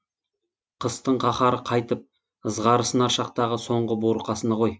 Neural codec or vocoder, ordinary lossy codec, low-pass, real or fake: none; none; none; real